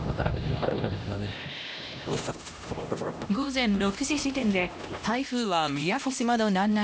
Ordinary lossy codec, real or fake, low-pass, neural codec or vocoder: none; fake; none; codec, 16 kHz, 1 kbps, X-Codec, HuBERT features, trained on LibriSpeech